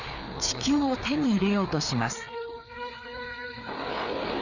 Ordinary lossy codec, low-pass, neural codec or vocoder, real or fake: none; 7.2 kHz; codec, 16 kHz, 4 kbps, FreqCodec, larger model; fake